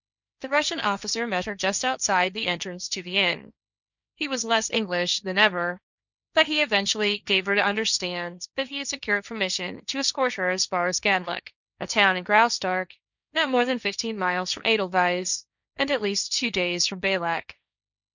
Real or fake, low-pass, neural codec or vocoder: fake; 7.2 kHz; codec, 16 kHz, 1.1 kbps, Voila-Tokenizer